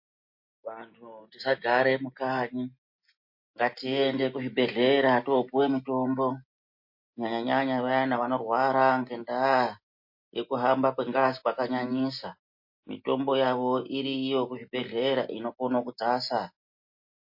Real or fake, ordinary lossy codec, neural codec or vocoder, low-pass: real; MP3, 32 kbps; none; 5.4 kHz